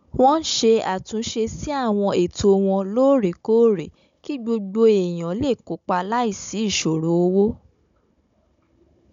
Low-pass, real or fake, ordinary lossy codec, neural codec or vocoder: 7.2 kHz; fake; MP3, 64 kbps; codec, 16 kHz, 16 kbps, FunCodec, trained on Chinese and English, 50 frames a second